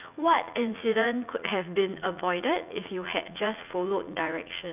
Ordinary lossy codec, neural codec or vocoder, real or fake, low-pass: none; vocoder, 44.1 kHz, 80 mel bands, Vocos; fake; 3.6 kHz